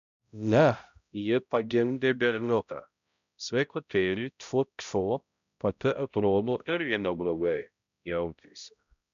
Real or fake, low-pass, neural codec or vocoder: fake; 7.2 kHz; codec, 16 kHz, 0.5 kbps, X-Codec, HuBERT features, trained on balanced general audio